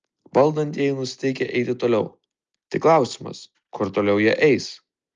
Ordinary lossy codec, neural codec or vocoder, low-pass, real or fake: Opus, 24 kbps; none; 7.2 kHz; real